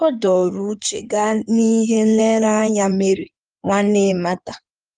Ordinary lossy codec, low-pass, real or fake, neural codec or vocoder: Opus, 32 kbps; 9.9 kHz; fake; codec, 16 kHz in and 24 kHz out, 2.2 kbps, FireRedTTS-2 codec